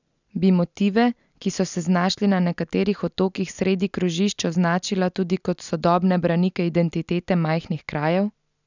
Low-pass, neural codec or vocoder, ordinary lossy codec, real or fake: 7.2 kHz; none; none; real